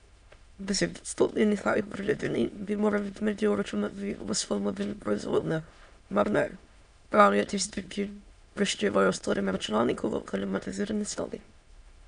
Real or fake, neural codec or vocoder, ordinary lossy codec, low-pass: fake; autoencoder, 22.05 kHz, a latent of 192 numbers a frame, VITS, trained on many speakers; none; 9.9 kHz